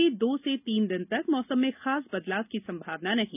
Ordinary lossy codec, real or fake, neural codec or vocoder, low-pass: none; real; none; 3.6 kHz